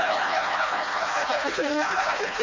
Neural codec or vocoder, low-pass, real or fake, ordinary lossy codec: codec, 16 kHz, 1 kbps, FreqCodec, smaller model; 7.2 kHz; fake; MP3, 32 kbps